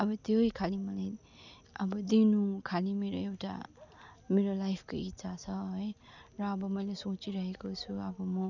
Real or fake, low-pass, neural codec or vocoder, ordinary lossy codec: real; 7.2 kHz; none; none